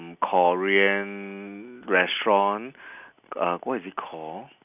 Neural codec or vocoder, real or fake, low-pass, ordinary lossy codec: none; real; 3.6 kHz; none